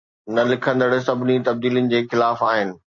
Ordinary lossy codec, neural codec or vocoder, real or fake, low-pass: AAC, 48 kbps; none; real; 7.2 kHz